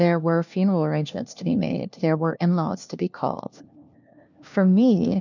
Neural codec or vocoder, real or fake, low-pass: codec, 16 kHz, 1 kbps, FunCodec, trained on LibriTTS, 50 frames a second; fake; 7.2 kHz